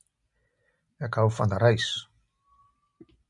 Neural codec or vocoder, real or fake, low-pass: none; real; 10.8 kHz